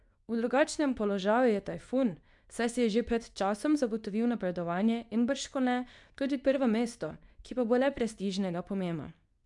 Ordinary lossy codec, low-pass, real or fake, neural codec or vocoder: none; 10.8 kHz; fake; codec, 24 kHz, 0.9 kbps, WavTokenizer, medium speech release version 2